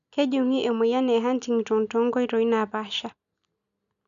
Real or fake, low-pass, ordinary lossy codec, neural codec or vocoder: real; 7.2 kHz; none; none